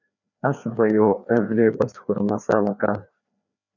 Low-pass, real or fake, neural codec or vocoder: 7.2 kHz; fake; codec, 16 kHz, 2 kbps, FreqCodec, larger model